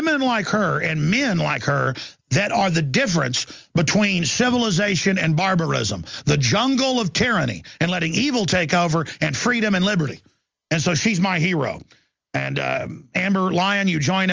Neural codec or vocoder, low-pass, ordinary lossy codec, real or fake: none; 7.2 kHz; Opus, 32 kbps; real